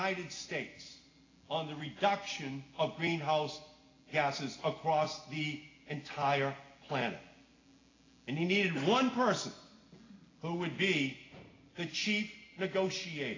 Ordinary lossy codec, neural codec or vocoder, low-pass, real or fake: AAC, 32 kbps; none; 7.2 kHz; real